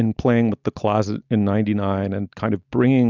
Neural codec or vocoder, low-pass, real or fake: none; 7.2 kHz; real